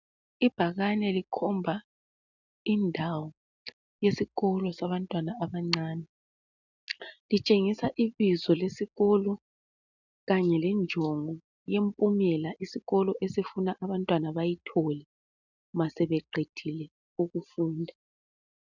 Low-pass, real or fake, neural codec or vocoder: 7.2 kHz; real; none